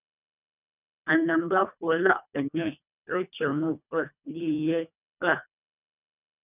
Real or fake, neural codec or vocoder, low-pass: fake; codec, 24 kHz, 1.5 kbps, HILCodec; 3.6 kHz